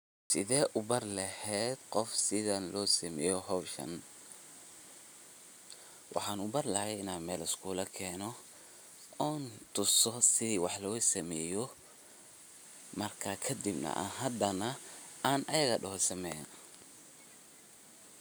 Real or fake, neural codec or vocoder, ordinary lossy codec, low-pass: real; none; none; none